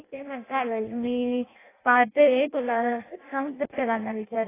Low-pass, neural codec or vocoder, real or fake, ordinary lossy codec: 3.6 kHz; codec, 16 kHz in and 24 kHz out, 0.6 kbps, FireRedTTS-2 codec; fake; AAC, 16 kbps